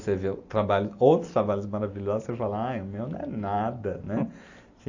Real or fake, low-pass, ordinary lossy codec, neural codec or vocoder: real; 7.2 kHz; AAC, 48 kbps; none